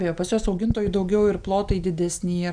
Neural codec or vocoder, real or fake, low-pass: none; real; 9.9 kHz